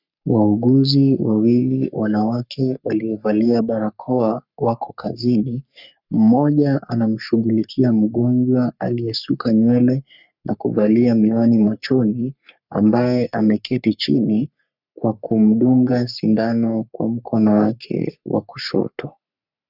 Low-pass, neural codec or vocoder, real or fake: 5.4 kHz; codec, 44.1 kHz, 3.4 kbps, Pupu-Codec; fake